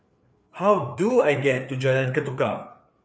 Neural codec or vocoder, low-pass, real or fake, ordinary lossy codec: codec, 16 kHz, 4 kbps, FreqCodec, larger model; none; fake; none